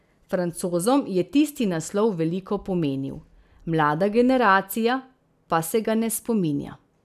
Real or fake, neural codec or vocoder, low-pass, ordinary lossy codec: real; none; 14.4 kHz; none